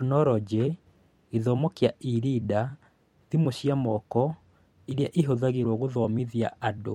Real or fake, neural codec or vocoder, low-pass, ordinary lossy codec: fake; vocoder, 44.1 kHz, 128 mel bands every 256 samples, BigVGAN v2; 19.8 kHz; MP3, 64 kbps